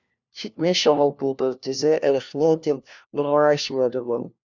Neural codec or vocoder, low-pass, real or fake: codec, 16 kHz, 1 kbps, FunCodec, trained on LibriTTS, 50 frames a second; 7.2 kHz; fake